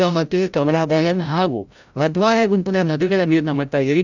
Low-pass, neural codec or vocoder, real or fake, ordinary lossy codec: 7.2 kHz; codec, 16 kHz, 0.5 kbps, FreqCodec, larger model; fake; none